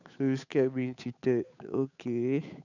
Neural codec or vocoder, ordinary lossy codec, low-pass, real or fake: codec, 16 kHz, 8 kbps, FunCodec, trained on Chinese and English, 25 frames a second; none; 7.2 kHz; fake